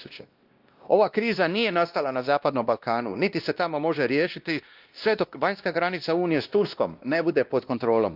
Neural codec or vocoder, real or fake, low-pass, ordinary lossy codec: codec, 16 kHz, 1 kbps, X-Codec, WavLM features, trained on Multilingual LibriSpeech; fake; 5.4 kHz; Opus, 32 kbps